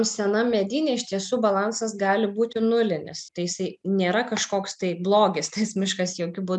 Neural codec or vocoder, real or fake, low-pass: none; real; 10.8 kHz